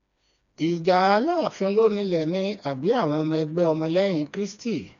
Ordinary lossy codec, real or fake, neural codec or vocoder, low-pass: none; fake; codec, 16 kHz, 2 kbps, FreqCodec, smaller model; 7.2 kHz